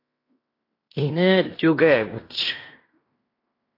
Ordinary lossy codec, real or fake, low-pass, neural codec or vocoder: AAC, 32 kbps; fake; 5.4 kHz; codec, 16 kHz in and 24 kHz out, 0.9 kbps, LongCat-Audio-Codec, fine tuned four codebook decoder